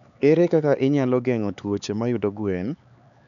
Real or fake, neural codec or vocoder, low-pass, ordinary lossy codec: fake; codec, 16 kHz, 4 kbps, X-Codec, HuBERT features, trained on LibriSpeech; 7.2 kHz; MP3, 96 kbps